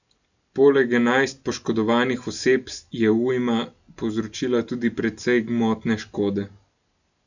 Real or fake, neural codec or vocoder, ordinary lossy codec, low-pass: real; none; none; 7.2 kHz